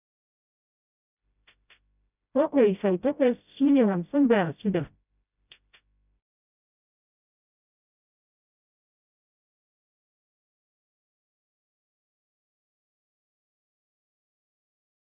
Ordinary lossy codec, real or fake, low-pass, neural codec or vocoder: none; fake; 3.6 kHz; codec, 16 kHz, 0.5 kbps, FreqCodec, smaller model